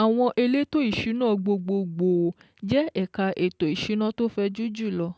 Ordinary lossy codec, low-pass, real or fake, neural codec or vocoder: none; none; real; none